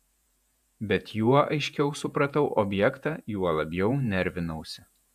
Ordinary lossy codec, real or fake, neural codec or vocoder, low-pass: AAC, 96 kbps; fake; vocoder, 48 kHz, 128 mel bands, Vocos; 14.4 kHz